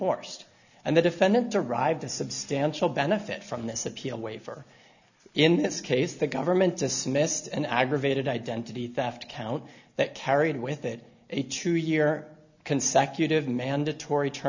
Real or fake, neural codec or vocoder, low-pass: real; none; 7.2 kHz